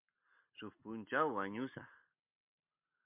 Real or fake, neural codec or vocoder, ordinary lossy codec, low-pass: real; none; MP3, 32 kbps; 3.6 kHz